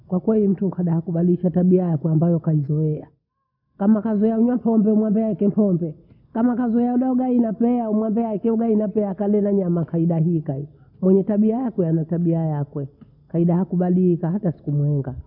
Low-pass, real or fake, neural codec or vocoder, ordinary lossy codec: 5.4 kHz; real; none; none